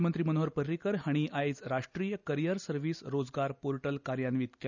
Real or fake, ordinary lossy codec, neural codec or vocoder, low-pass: real; none; none; 7.2 kHz